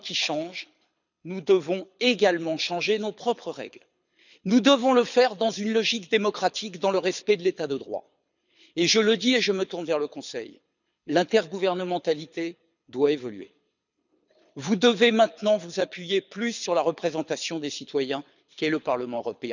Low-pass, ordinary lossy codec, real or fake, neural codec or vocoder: 7.2 kHz; none; fake; codec, 24 kHz, 6 kbps, HILCodec